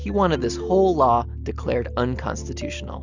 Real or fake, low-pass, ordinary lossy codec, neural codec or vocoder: real; 7.2 kHz; Opus, 64 kbps; none